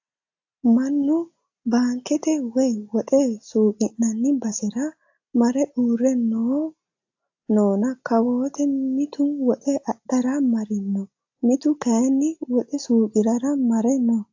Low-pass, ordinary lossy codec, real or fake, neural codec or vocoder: 7.2 kHz; AAC, 48 kbps; real; none